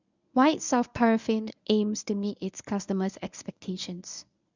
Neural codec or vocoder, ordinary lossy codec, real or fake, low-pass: codec, 24 kHz, 0.9 kbps, WavTokenizer, medium speech release version 1; none; fake; 7.2 kHz